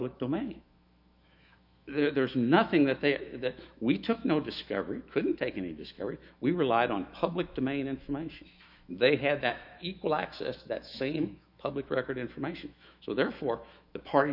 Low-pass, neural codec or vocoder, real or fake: 5.4 kHz; autoencoder, 48 kHz, 128 numbers a frame, DAC-VAE, trained on Japanese speech; fake